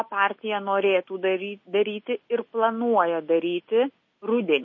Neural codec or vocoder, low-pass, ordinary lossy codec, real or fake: none; 7.2 kHz; MP3, 32 kbps; real